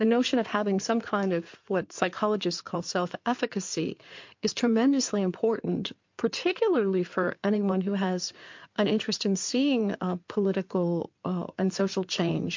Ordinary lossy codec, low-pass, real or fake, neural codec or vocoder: MP3, 48 kbps; 7.2 kHz; fake; codec, 16 kHz in and 24 kHz out, 2.2 kbps, FireRedTTS-2 codec